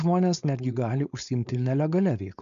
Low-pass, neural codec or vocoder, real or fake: 7.2 kHz; codec, 16 kHz, 4.8 kbps, FACodec; fake